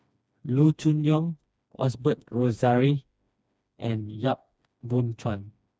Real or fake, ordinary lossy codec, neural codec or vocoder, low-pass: fake; none; codec, 16 kHz, 2 kbps, FreqCodec, smaller model; none